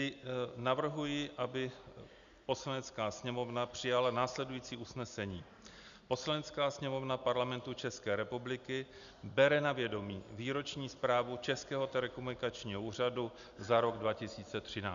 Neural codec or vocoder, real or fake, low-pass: none; real; 7.2 kHz